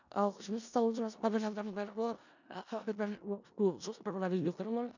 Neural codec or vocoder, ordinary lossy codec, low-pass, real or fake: codec, 16 kHz in and 24 kHz out, 0.4 kbps, LongCat-Audio-Codec, four codebook decoder; none; 7.2 kHz; fake